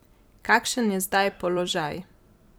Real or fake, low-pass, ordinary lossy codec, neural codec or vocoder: fake; none; none; vocoder, 44.1 kHz, 128 mel bands every 256 samples, BigVGAN v2